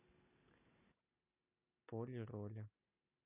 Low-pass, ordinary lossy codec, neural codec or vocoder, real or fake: 3.6 kHz; none; none; real